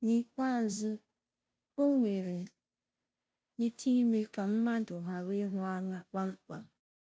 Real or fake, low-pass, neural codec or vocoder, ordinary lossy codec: fake; none; codec, 16 kHz, 0.5 kbps, FunCodec, trained on Chinese and English, 25 frames a second; none